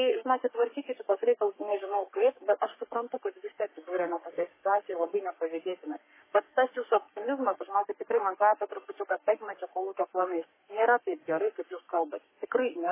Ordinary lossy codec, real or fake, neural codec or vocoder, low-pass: MP3, 16 kbps; fake; codec, 44.1 kHz, 3.4 kbps, Pupu-Codec; 3.6 kHz